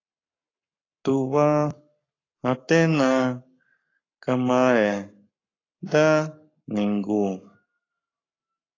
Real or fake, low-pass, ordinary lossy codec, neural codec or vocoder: fake; 7.2 kHz; AAC, 32 kbps; codec, 44.1 kHz, 7.8 kbps, Pupu-Codec